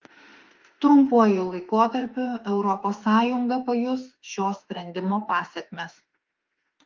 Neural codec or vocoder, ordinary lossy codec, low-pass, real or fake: autoencoder, 48 kHz, 32 numbers a frame, DAC-VAE, trained on Japanese speech; Opus, 32 kbps; 7.2 kHz; fake